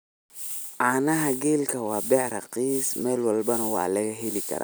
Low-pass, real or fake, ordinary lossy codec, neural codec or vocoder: none; real; none; none